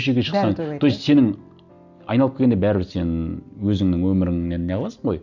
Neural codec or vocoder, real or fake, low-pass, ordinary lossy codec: none; real; 7.2 kHz; none